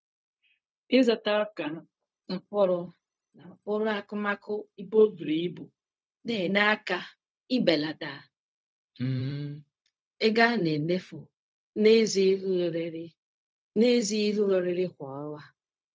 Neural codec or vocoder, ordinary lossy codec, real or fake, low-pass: codec, 16 kHz, 0.4 kbps, LongCat-Audio-Codec; none; fake; none